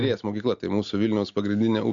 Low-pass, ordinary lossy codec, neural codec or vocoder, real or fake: 7.2 kHz; MP3, 96 kbps; none; real